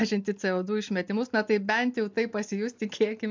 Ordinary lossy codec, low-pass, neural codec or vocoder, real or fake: MP3, 64 kbps; 7.2 kHz; none; real